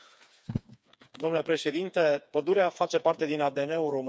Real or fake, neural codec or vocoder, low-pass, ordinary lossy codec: fake; codec, 16 kHz, 4 kbps, FreqCodec, smaller model; none; none